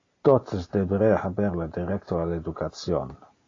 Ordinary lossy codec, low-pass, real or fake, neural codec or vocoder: AAC, 32 kbps; 7.2 kHz; real; none